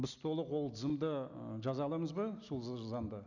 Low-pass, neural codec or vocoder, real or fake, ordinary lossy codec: 7.2 kHz; none; real; none